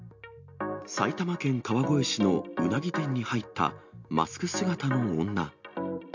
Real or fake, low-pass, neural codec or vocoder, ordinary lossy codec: real; 7.2 kHz; none; MP3, 64 kbps